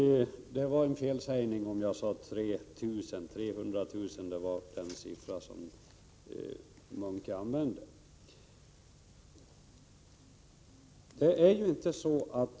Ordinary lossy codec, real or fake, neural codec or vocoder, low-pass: none; real; none; none